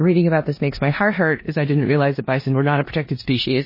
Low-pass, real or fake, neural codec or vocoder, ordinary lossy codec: 5.4 kHz; fake; codec, 16 kHz in and 24 kHz out, 0.9 kbps, LongCat-Audio-Codec, four codebook decoder; MP3, 24 kbps